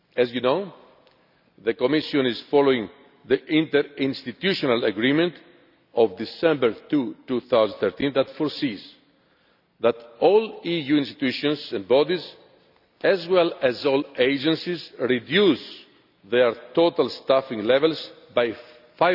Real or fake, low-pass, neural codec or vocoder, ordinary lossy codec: real; 5.4 kHz; none; none